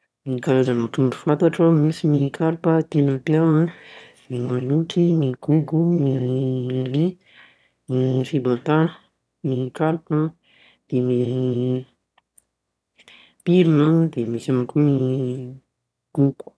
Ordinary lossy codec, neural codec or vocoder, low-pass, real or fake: none; autoencoder, 22.05 kHz, a latent of 192 numbers a frame, VITS, trained on one speaker; none; fake